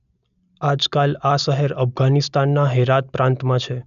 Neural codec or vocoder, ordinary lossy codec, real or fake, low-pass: none; none; real; 7.2 kHz